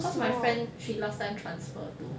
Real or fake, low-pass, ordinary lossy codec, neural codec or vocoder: real; none; none; none